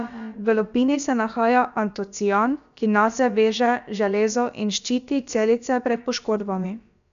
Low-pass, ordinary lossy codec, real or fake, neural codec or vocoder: 7.2 kHz; none; fake; codec, 16 kHz, about 1 kbps, DyCAST, with the encoder's durations